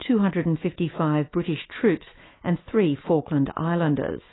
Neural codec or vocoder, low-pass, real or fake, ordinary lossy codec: autoencoder, 48 kHz, 128 numbers a frame, DAC-VAE, trained on Japanese speech; 7.2 kHz; fake; AAC, 16 kbps